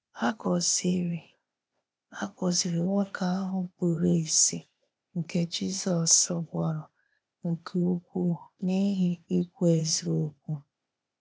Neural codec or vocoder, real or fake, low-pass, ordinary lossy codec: codec, 16 kHz, 0.8 kbps, ZipCodec; fake; none; none